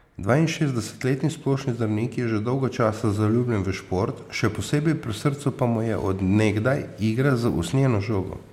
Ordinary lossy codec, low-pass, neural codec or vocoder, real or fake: MP3, 96 kbps; 19.8 kHz; none; real